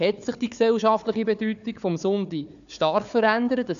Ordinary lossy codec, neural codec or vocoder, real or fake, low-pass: MP3, 96 kbps; codec, 16 kHz, 4 kbps, FunCodec, trained on Chinese and English, 50 frames a second; fake; 7.2 kHz